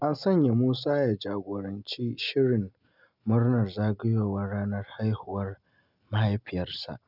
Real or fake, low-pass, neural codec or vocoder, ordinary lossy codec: real; 5.4 kHz; none; none